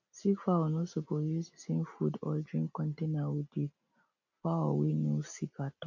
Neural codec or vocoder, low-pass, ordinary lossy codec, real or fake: none; 7.2 kHz; none; real